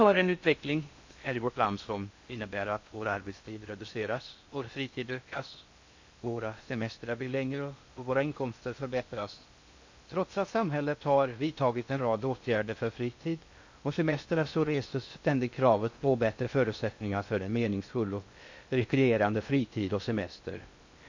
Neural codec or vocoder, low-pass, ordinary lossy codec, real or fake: codec, 16 kHz in and 24 kHz out, 0.6 kbps, FocalCodec, streaming, 4096 codes; 7.2 kHz; MP3, 48 kbps; fake